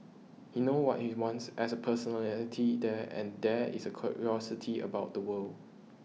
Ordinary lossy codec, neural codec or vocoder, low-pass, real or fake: none; none; none; real